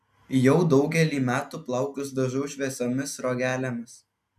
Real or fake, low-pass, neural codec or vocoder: real; 14.4 kHz; none